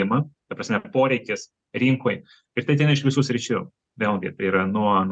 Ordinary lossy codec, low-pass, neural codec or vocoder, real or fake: MP3, 96 kbps; 9.9 kHz; none; real